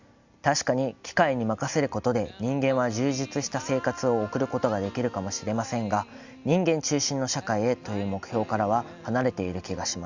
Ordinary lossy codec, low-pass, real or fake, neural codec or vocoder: Opus, 64 kbps; 7.2 kHz; real; none